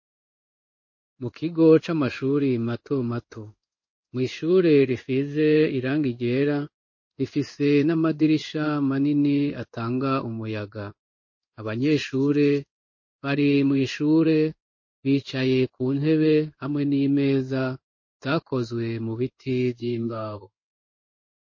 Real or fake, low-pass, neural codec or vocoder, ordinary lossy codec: fake; 7.2 kHz; codec, 16 kHz in and 24 kHz out, 1 kbps, XY-Tokenizer; MP3, 32 kbps